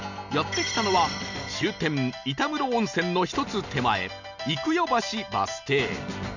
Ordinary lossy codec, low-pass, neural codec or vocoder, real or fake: none; 7.2 kHz; none; real